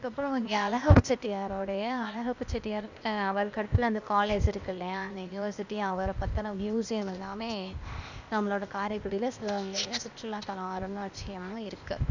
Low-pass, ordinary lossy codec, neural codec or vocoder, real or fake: 7.2 kHz; Opus, 64 kbps; codec, 16 kHz, 0.8 kbps, ZipCodec; fake